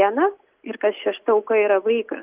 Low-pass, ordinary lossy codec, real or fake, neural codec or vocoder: 3.6 kHz; Opus, 24 kbps; real; none